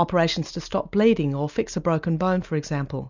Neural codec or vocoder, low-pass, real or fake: none; 7.2 kHz; real